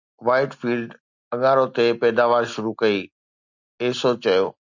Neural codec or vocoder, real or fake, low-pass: none; real; 7.2 kHz